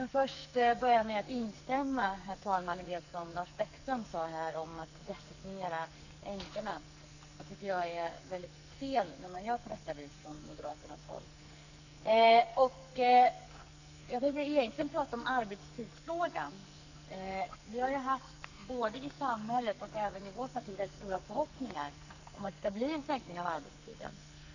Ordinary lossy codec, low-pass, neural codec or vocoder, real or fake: none; 7.2 kHz; codec, 44.1 kHz, 2.6 kbps, SNAC; fake